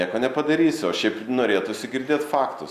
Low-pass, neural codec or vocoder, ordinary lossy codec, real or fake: 14.4 kHz; none; Opus, 64 kbps; real